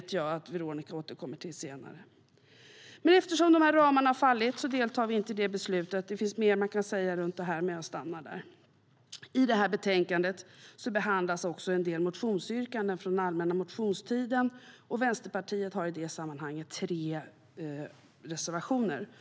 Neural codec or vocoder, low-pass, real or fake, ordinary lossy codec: none; none; real; none